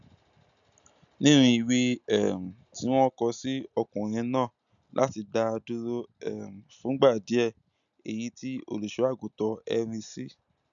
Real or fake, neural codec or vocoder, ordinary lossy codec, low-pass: real; none; none; 7.2 kHz